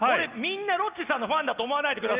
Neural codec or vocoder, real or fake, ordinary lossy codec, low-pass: none; real; Opus, 32 kbps; 3.6 kHz